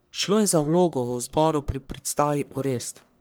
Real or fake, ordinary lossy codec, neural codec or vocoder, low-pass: fake; none; codec, 44.1 kHz, 1.7 kbps, Pupu-Codec; none